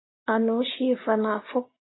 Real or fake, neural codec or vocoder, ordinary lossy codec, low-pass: real; none; AAC, 16 kbps; 7.2 kHz